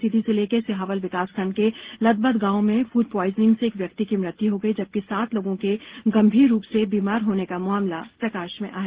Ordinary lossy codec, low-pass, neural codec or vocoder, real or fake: Opus, 16 kbps; 3.6 kHz; none; real